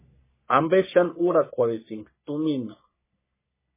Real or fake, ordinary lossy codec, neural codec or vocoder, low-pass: fake; MP3, 16 kbps; codec, 44.1 kHz, 3.4 kbps, Pupu-Codec; 3.6 kHz